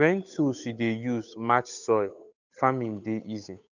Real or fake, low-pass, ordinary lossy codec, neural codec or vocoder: fake; 7.2 kHz; none; codec, 16 kHz, 8 kbps, FunCodec, trained on Chinese and English, 25 frames a second